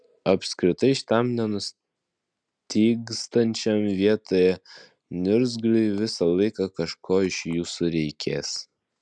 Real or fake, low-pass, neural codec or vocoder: real; 9.9 kHz; none